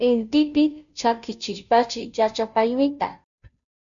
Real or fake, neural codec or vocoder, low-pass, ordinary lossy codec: fake; codec, 16 kHz, 0.5 kbps, FunCodec, trained on Chinese and English, 25 frames a second; 7.2 kHz; MP3, 96 kbps